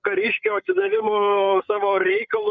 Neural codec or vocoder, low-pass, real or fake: codec, 16 kHz, 8 kbps, FreqCodec, larger model; 7.2 kHz; fake